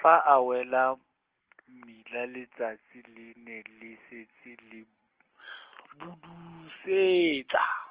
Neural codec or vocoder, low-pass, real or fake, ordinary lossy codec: none; 3.6 kHz; real; Opus, 16 kbps